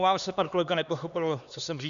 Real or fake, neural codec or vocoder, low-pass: fake; codec, 16 kHz, 2 kbps, X-Codec, HuBERT features, trained on LibriSpeech; 7.2 kHz